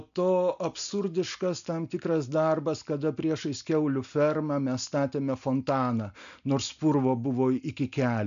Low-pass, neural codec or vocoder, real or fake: 7.2 kHz; none; real